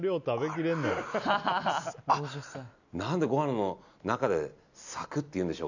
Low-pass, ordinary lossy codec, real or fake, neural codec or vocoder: 7.2 kHz; none; real; none